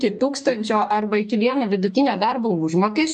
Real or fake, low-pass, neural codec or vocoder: fake; 10.8 kHz; codec, 44.1 kHz, 2.6 kbps, DAC